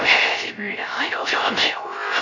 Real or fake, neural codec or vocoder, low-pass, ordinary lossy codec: fake; codec, 16 kHz, 0.3 kbps, FocalCodec; 7.2 kHz; none